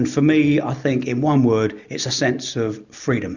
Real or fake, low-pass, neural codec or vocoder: real; 7.2 kHz; none